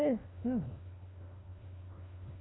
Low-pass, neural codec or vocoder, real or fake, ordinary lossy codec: 7.2 kHz; codec, 16 kHz, 1 kbps, FunCodec, trained on LibriTTS, 50 frames a second; fake; AAC, 16 kbps